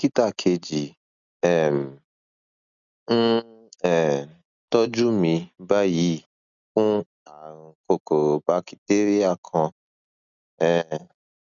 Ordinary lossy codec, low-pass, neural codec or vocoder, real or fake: none; 7.2 kHz; none; real